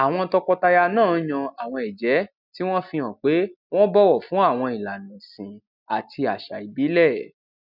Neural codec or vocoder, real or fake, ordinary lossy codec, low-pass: none; real; none; 5.4 kHz